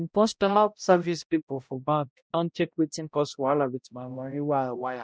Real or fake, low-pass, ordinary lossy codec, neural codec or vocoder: fake; none; none; codec, 16 kHz, 0.5 kbps, X-Codec, HuBERT features, trained on balanced general audio